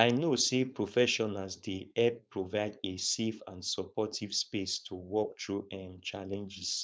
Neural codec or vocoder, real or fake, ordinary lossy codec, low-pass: codec, 16 kHz, 4.8 kbps, FACodec; fake; none; none